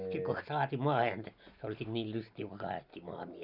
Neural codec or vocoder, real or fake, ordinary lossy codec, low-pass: none; real; none; 5.4 kHz